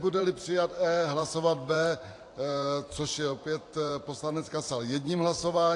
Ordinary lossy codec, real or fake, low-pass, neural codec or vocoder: AAC, 48 kbps; fake; 10.8 kHz; vocoder, 44.1 kHz, 128 mel bands every 512 samples, BigVGAN v2